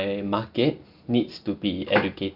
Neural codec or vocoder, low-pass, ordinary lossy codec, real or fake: none; 5.4 kHz; none; real